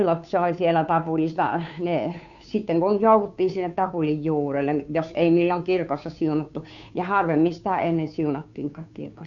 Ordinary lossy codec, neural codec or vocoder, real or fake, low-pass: none; codec, 16 kHz, 2 kbps, FunCodec, trained on Chinese and English, 25 frames a second; fake; 7.2 kHz